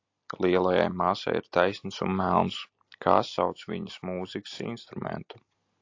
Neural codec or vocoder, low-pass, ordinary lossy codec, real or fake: none; 7.2 kHz; AAC, 48 kbps; real